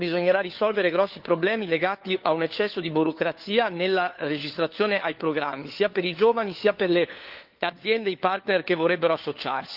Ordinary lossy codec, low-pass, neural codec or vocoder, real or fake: Opus, 32 kbps; 5.4 kHz; codec, 16 kHz, 4 kbps, FunCodec, trained on LibriTTS, 50 frames a second; fake